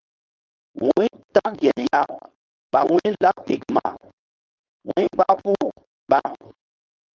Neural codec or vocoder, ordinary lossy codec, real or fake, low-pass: codec, 16 kHz, 4.8 kbps, FACodec; Opus, 24 kbps; fake; 7.2 kHz